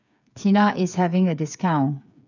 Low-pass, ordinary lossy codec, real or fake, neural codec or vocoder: 7.2 kHz; none; fake; codec, 16 kHz, 8 kbps, FreqCodec, smaller model